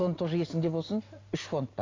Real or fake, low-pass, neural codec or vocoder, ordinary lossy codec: real; 7.2 kHz; none; AAC, 32 kbps